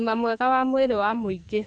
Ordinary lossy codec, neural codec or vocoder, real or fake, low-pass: none; codec, 44.1 kHz, 2.6 kbps, SNAC; fake; 9.9 kHz